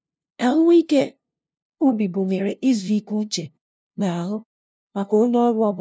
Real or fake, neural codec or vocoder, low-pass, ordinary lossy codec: fake; codec, 16 kHz, 0.5 kbps, FunCodec, trained on LibriTTS, 25 frames a second; none; none